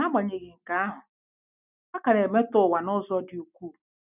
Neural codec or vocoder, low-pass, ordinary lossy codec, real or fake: none; 3.6 kHz; none; real